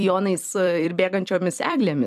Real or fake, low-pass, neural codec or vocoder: fake; 14.4 kHz; vocoder, 44.1 kHz, 128 mel bands every 256 samples, BigVGAN v2